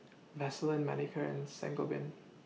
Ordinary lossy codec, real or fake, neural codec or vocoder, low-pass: none; real; none; none